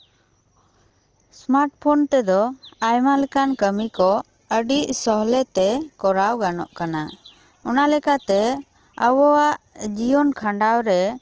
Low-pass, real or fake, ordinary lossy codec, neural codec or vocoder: 7.2 kHz; real; Opus, 16 kbps; none